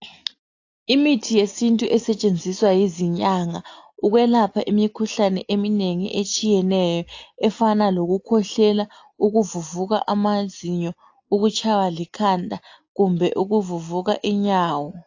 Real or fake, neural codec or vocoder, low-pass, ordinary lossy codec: real; none; 7.2 kHz; AAC, 48 kbps